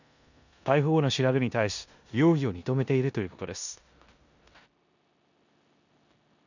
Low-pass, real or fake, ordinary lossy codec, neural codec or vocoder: 7.2 kHz; fake; none; codec, 16 kHz in and 24 kHz out, 0.9 kbps, LongCat-Audio-Codec, four codebook decoder